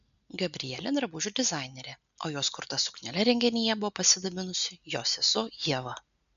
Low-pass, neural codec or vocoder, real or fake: 7.2 kHz; none; real